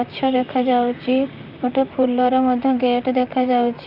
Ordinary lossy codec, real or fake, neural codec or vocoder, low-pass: none; fake; vocoder, 44.1 kHz, 128 mel bands, Pupu-Vocoder; 5.4 kHz